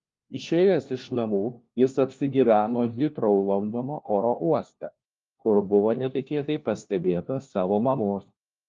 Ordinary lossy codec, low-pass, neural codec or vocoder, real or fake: Opus, 32 kbps; 7.2 kHz; codec, 16 kHz, 1 kbps, FunCodec, trained on LibriTTS, 50 frames a second; fake